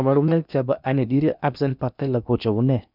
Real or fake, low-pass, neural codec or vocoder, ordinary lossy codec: fake; 5.4 kHz; codec, 16 kHz in and 24 kHz out, 0.8 kbps, FocalCodec, streaming, 65536 codes; none